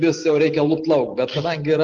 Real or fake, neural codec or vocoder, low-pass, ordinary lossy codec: real; none; 7.2 kHz; Opus, 16 kbps